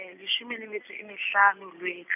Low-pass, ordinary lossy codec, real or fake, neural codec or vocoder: 3.6 kHz; none; real; none